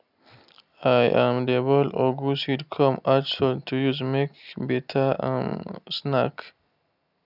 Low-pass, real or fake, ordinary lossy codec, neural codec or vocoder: 5.4 kHz; real; none; none